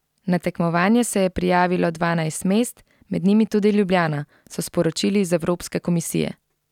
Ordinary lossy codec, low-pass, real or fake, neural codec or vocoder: none; 19.8 kHz; real; none